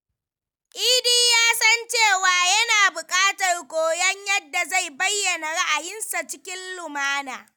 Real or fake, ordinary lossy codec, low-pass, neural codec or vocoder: real; none; none; none